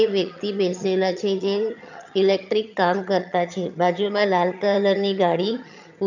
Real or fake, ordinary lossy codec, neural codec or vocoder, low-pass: fake; none; vocoder, 22.05 kHz, 80 mel bands, HiFi-GAN; 7.2 kHz